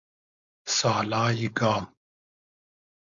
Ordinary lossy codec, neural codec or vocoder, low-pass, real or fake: AAC, 64 kbps; codec, 16 kHz, 4.8 kbps, FACodec; 7.2 kHz; fake